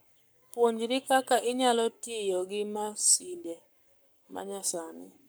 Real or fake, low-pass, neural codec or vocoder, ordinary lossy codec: fake; none; codec, 44.1 kHz, 7.8 kbps, Pupu-Codec; none